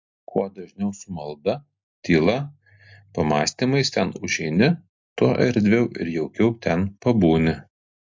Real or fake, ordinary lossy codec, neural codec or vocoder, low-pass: real; MP3, 48 kbps; none; 7.2 kHz